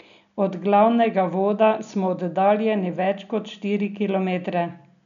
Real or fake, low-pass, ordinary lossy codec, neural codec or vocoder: real; 7.2 kHz; none; none